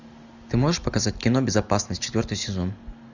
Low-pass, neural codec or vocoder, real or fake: 7.2 kHz; none; real